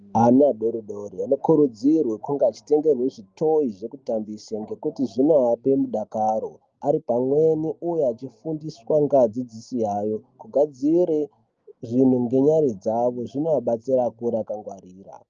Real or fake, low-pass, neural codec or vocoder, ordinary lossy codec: real; 7.2 kHz; none; Opus, 24 kbps